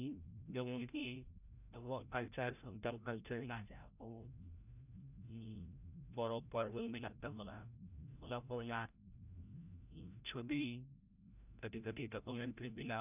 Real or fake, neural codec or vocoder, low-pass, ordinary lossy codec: fake; codec, 16 kHz, 0.5 kbps, FreqCodec, larger model; 3.6 kHz; none